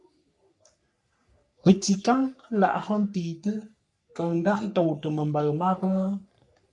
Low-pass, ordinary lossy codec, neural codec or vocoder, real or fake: 10.8 kHz; MP3, 96 kbps; codec, 44.1 kHz, 3.4 kbps, Pupu-Codec; fake